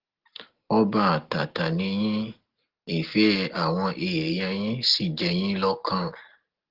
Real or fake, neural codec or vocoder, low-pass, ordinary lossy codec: real; none; 5.4 kHz; Opus, 16 kbps